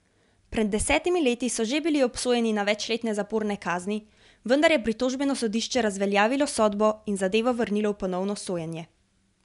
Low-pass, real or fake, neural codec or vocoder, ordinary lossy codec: 10.8 kHz; real; none; MP3, 96 kbps